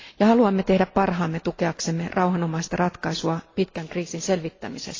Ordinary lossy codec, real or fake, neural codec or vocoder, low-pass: AAC, 32 kbps; real; none; 7.2 kHz